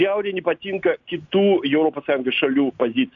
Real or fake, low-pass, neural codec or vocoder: real; 7.2 kHz; none